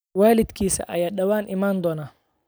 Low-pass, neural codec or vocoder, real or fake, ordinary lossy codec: none; none; real; none